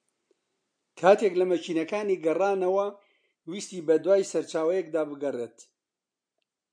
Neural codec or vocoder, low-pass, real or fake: none; 9.9 kHz; real